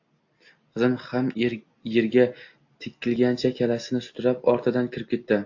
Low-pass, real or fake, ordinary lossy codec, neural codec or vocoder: 7.2 kHz; real; AAC, 48 kbps; none